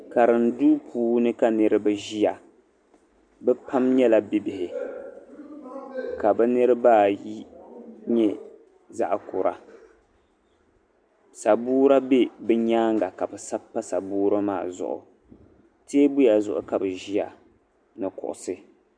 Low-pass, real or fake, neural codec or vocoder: 9.9 kHz; real; none